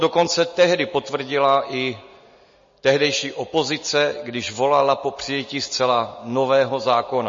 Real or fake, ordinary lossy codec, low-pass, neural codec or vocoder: real; MP3, 32 kbps; 7.2 kHz; none